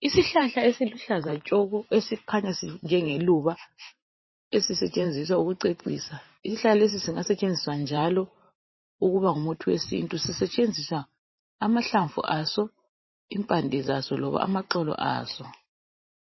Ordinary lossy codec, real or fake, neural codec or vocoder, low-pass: MP3, 24 kbps; fake; vocoder, 44.1 kHz, 128 mel bands every 512 samples, BigVGAN v2; 7.2 kHz